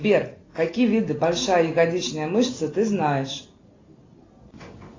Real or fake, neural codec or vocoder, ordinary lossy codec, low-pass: real; none; AAC, 32 kbps; 7.2 kHz